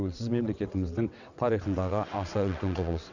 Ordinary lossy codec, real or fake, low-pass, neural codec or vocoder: none; fake; 7.2 kHz; vocoder, 22.05 kHz, 80 mel bands, WaveNeXt